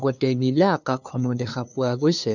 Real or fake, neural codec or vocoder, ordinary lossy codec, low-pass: fake; codec, 16 kHz, 2 kbps, FunCodec, trained on LibriTTS, 25 frames a second; none; 7.2 kHz